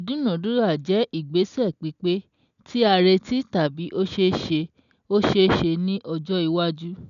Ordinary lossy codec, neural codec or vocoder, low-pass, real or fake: none; none; 7.2 kHz; real